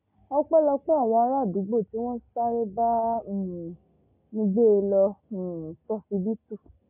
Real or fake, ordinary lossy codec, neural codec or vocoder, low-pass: real; MP3, 32 kbps; none; 3.6 kHz